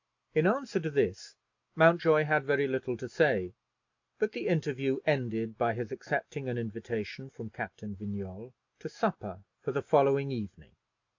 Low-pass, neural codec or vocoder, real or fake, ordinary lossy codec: 7.2 kHz; none; real; AAC, 48 kbps